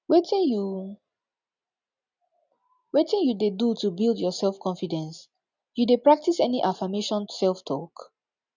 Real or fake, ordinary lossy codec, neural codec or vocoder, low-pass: real; none; none; 7.2 kHz